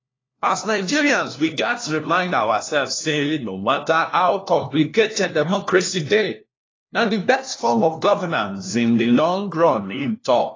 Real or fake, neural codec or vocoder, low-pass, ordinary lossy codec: fake; codec, 16 kHz, 1 kbps, FunCodec, trained on LibriTTS, 50 frames a second; 7.2 kHz; AAC, 32 kbps